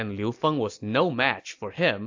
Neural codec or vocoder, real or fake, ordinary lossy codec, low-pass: none; real; AAC, 48 kbps; 7.2 kHz